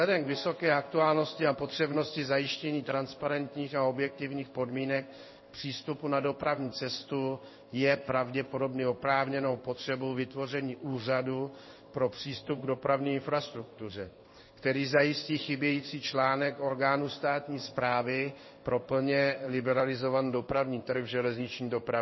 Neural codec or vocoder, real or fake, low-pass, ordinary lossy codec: codec, 16 kHz in and 24 kHz out, 1 kbps, XY-Tokenizer; fake; 7.2 kHz; MP3, 24 kbps